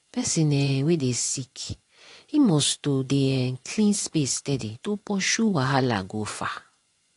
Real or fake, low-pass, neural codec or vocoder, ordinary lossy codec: fake; 10.8 kHz; vocoder, 24 kHz, 100 mel bands, Vocos; AAC, 48 kbps